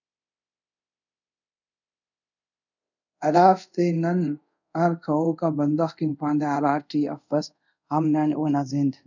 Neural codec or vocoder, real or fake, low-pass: codec, 24 kHz, 0.5 kbps, DualCodec; fake; 7.2 kHz